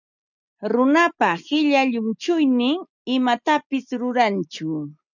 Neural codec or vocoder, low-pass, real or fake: none; 7.2 kHz; real